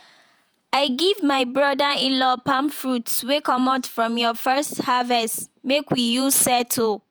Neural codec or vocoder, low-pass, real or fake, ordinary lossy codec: vocoder, 48 kHz, 128 mel bands, Vocos; none; fake; none